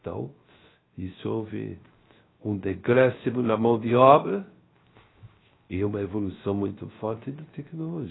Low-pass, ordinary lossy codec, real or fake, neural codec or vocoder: 7.2 kHz; AAC, 16 kbps; fake; codec, 16 kHz, 0.3 kbps, FocalCodec